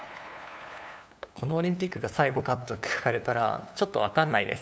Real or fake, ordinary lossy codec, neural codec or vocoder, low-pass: fake; none; codec, 16 kHz, 2 kbps, FunCodec, trained on LibriTTS, 25 frames a second; none